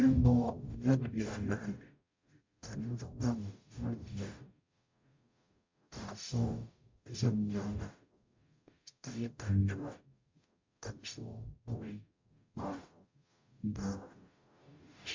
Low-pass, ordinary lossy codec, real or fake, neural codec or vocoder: 7.2 kHz; MP3, 48 kbps; fake; codec, 44.1 kHz, 0.9 kbps, DAC